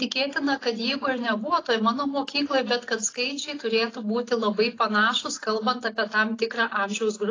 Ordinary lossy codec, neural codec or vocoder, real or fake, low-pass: AAC, 32 kbps; none; real; 7.2 kHz